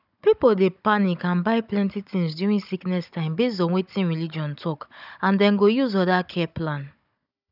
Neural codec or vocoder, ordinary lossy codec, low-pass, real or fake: codec, 16 kHz, 16 kbps, FunCodec, trained on Chinese and English, 50 frames a second; none; 5.4 kHz; fake